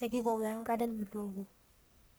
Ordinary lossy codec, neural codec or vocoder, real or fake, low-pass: none; codec, 44.1 kHz, 1.7 kbps, Pupu-Codec; fake; none